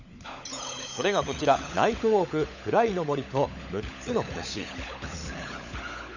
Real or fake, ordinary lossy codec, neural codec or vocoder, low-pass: fake; none; codec, 16 kHz, 16 kbps, FunCodec, trained on LibriTTS, 50 frames a second; 7.2 kHz